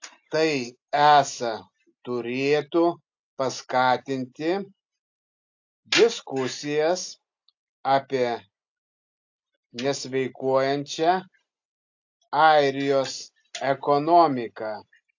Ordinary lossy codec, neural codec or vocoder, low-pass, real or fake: AAC, 48 kbps; none; 7.2 kHz; real